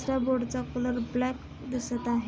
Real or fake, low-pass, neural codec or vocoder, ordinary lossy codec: real; none; none; none